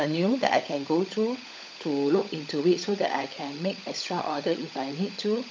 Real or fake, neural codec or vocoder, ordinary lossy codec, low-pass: fake; codec, 16 kHz, 16 kbps, FunCodec, trained on LibriTTS, 50 frames a second; none; none